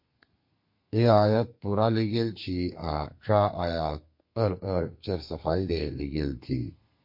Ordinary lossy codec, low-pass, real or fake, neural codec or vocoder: MP3, 32 kbps; 5.4 kHz; fake; codec, 32 kHz, 1.9 kbps, SNAC